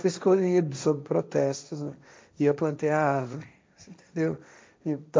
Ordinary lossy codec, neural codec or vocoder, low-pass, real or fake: none; codec, 16 kHz, 1.1 kbps, Voila-Tokenizer; none; fake